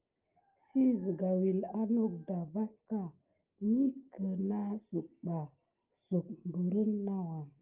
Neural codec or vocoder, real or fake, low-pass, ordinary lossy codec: none; real; 3.6 kHz; Opus, 24 kbps